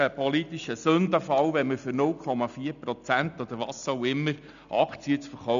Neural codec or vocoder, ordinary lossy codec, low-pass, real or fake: none; none; 7.2 kHz; real